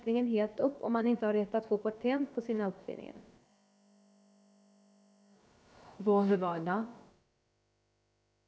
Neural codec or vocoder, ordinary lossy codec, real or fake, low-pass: codec, 16 kHz, about 1 kbps, DyCAST, with the encoder's durations; none; fake; none